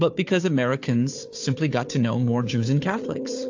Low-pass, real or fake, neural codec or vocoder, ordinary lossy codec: 7.2 kHz; fake; codec, 16 kHz, 4 kbps, FunCodec, trained on Chinese and English, 50 frames a second; AAC, 48 kbps